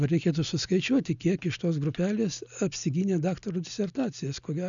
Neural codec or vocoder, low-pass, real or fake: none; 7.2 kHz; real